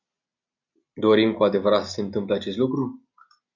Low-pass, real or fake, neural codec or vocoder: 7.2 kHz; real; none